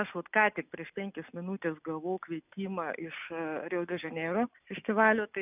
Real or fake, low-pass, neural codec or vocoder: real; 3.6 kHz; none